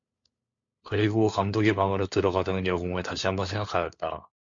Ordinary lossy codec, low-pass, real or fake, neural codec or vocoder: MP3, 48 kbps; 7.2 kHz; fake; codec, 16 kHz, 4 kbps, FunCodec, trained on LibriTTS, 50 frames a second